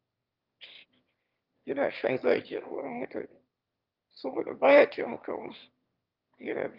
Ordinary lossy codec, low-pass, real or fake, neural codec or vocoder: Opus, 32 kbps; 5.4 kHz; fake; autoencoder, 22.05 kHz, a latent of 192 numbers a frame, VITS, trained on one speaker